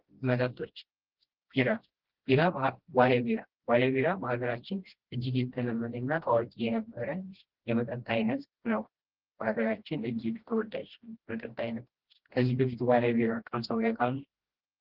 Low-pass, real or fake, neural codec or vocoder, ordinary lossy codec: 5.4 kHz; fake; codec, 16 kHz, 1 kbps, FreqCodec, smaller model; Opus, 16 kbps